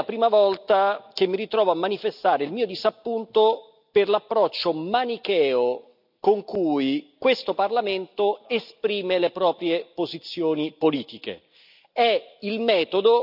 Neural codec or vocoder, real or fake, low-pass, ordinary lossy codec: none; real; 5.4 kHz; none